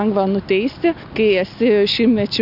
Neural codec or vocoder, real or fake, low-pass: none; real; 5.4 kHz